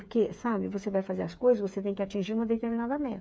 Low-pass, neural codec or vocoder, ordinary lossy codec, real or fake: none; codec, 16 kHz, 8 kbps, FreqCodec, smaller model; none; fake